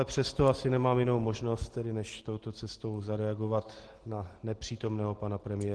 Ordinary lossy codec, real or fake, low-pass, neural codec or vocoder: Opus, 16 kbps; real; 10.8 kHz; none